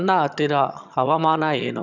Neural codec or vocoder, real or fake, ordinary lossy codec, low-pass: vocoder, 22.05 kHz, 80 mel bands, HiFi-GAN; fake; none; 7.2 kHz